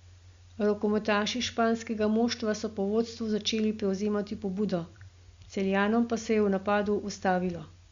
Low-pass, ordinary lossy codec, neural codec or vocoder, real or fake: 7.2 kHz; Opus, 64 kbps; none; real